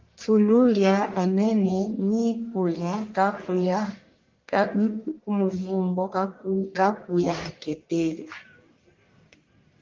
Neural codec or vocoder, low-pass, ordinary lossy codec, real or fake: codec, 44.1 kHz, 1.7 kbps, Pupu-Codec; 7.2 kHz; Opus, 32 kbps; fake